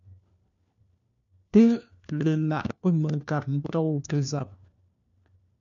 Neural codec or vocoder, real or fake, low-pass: codec, 16 kHz, 1 kbps, FunCodec, trained on LibriTTS, 50 frames a second; fake; 7.2 kHz